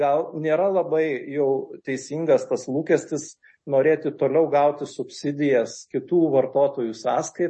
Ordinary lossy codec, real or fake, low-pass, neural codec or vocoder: MP3, 32 kbps; real; 10.8 kHz; none